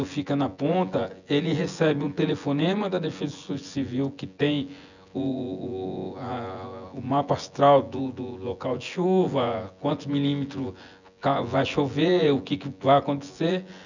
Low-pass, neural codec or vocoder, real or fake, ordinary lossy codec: 7.2 kHz; vocoder, 24 kHz, 100 mel bands, Vocos; fake; none